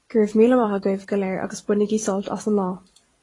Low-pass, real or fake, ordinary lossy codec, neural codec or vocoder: 10.8 kHz; fake; AAC, 32 kbps; vocoder, 44.1 kHz, 128 mel bands every 256 samples, BigVGAN v2